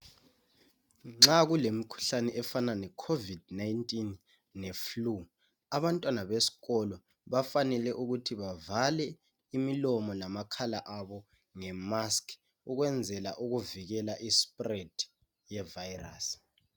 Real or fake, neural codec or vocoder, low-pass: real; none; 19.8 kHz